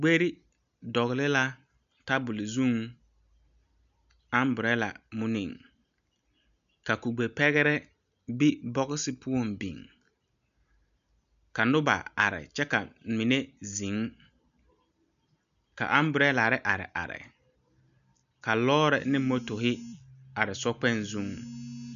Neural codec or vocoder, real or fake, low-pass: none; real; 7.2 kHz